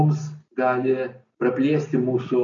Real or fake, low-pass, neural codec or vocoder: real; 7.2 kHz; none